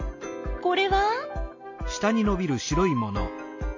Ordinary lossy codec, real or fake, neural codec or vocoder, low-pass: MP3, 48 kbps; real; none; 7.2 kHz